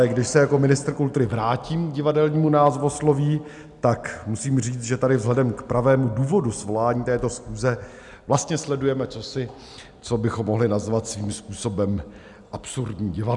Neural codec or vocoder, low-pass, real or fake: none; 10.8 kHz; real